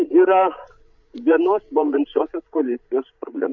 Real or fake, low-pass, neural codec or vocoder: fake; 7.2 kHz; codec, 16 kHz, 16 kbps, FreqCodec, larger model